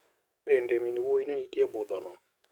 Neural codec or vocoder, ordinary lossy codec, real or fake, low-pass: codec, 44.1 kHz, 7.8 kbps, DAC; none; fake; 19.8 kHz